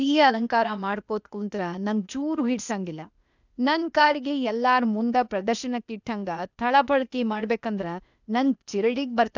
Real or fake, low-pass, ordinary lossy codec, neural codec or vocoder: fake; 7.2 kHz; none; codec, 16 kHz, 0.8 kbps, ZipCodec